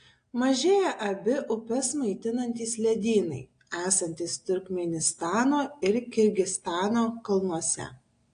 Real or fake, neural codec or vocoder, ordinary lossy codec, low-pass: real; none; AAC, 48 kbps; 9.9 kHz